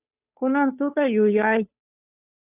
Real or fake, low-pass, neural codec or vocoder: fake; 3.6 kHz; codec, 16 kHz, 2 kbps, FunCodec, trained on Chinese and English, 25 frames a second